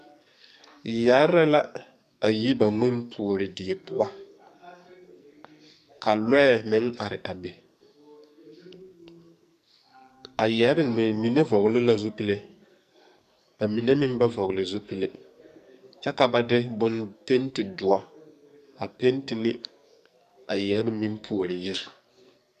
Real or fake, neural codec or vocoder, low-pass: fake; codec, 44.1 kHz, 2.6 kbps, SNAC; 14.4 kHz